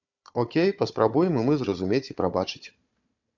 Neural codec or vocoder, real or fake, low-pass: codec, 16 kHz, 4 kbps, FunCodec, trained on Chinese and English, 50 frames a second; fake; 7.2 kHz